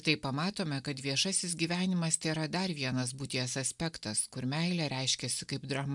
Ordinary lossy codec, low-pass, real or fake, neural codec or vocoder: MP3, 96 kbps; 10.8 kHz; real; none